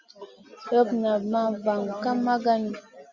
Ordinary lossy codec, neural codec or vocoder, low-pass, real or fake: Opus, 64 kbps; none; 7.2 kHz; real